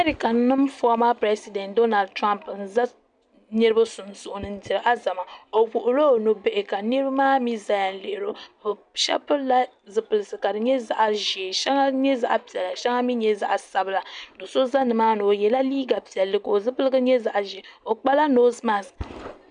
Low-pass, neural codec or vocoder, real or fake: 9.9 kHz; none; real